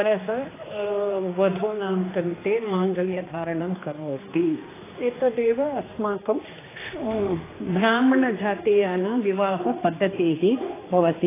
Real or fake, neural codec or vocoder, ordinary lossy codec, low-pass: fake; codec, 16 kHz, 2 kbps, X-Codec, HuBERT features, trained on general audio; AAC, 16 kbps; 3.6 kHz